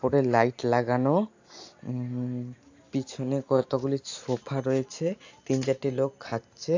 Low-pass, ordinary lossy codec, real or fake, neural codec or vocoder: 7.2 kHz; AAC, 48 kbps; real; none